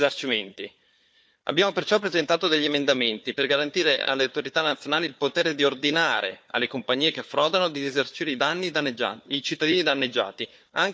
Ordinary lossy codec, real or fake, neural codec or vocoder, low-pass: none; fake; codec, 16 kHz, 4 kbps, FunCodec, trained on Chinese and English, 50 frames a second; none